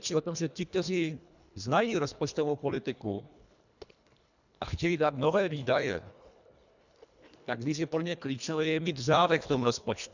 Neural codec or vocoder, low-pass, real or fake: codec, 24 kHz, 1.5 kbps, HILCodec; 7.2 kHz; fake